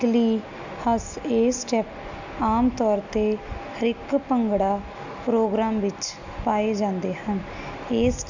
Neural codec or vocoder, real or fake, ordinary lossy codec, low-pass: none; real; none; 7.2 kHz